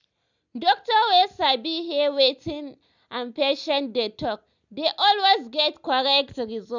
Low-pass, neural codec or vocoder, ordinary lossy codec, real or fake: 7.2 kHz; none; none; real